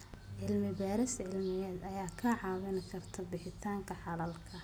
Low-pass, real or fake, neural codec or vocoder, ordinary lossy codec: none; real; none; none